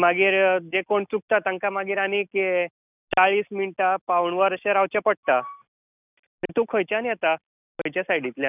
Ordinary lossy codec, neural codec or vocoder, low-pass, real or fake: none; none; 3.6 kHz; real